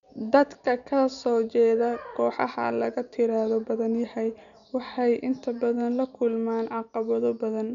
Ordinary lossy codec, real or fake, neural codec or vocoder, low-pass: none; real; none; 7.2 kHz